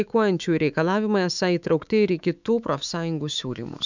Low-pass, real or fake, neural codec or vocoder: 7.2 kHz; fake; autoencoder, 48 kHz, 128 numbers a frame, DAC-VAE, trained on Japanese speech